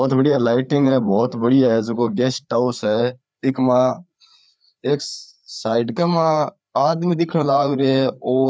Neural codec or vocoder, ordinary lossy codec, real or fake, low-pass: codec, 16 kHz, 4 kbps, FreqCodec, larger model; none; fake; none